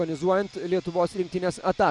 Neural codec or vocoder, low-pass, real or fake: none; 10.8 kHz; real